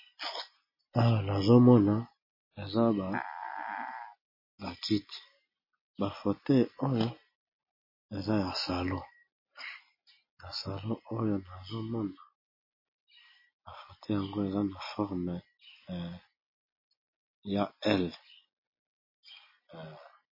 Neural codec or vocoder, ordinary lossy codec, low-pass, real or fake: none; MP3, 24 kbps; 5.4 kHz; real